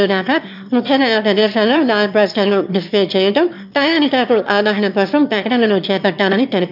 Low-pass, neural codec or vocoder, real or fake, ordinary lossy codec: 5.4 kHz; autoencoder, 22.05 kHz, a latent of 192 numbers a frame, VITS, trained on one speaker; fake; none